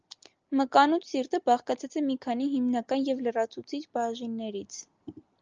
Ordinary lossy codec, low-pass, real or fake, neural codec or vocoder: Opus, 24 kbps; 7.2 kHz; real; none